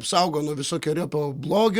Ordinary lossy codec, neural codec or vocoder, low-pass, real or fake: Opus, 64 kbps; none; 19.8 kHz; real